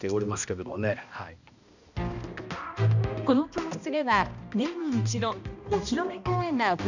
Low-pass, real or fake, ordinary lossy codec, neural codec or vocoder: 7.2 kHz; fake; none; codec, 16 kHz, 1 kbps, X-Codec, HuBERT features, trained on balanced general audio